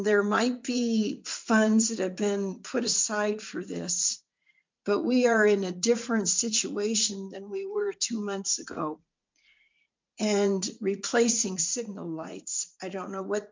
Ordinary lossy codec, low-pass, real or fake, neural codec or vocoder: MP3, 64 kbps; 7.2 kHz; fake; vocoder, 44.1 kHz, 80 mel bands, Vocos